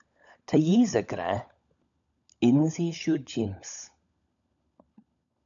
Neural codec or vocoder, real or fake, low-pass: codec, 16 kHz, 16 kbps, FunCodec, trained on LibriTTS, 50 frames a second; fake; 7.2 kHz